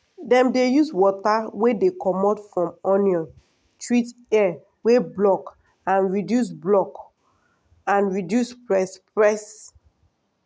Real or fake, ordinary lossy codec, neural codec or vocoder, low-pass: real; none; none; none